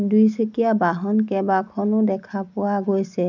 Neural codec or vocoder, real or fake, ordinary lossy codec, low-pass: none; real; none; 7.2 kHz